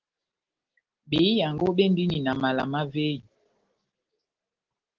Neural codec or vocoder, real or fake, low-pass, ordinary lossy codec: none; real; 7.2 kHz; Opus, 16 kbps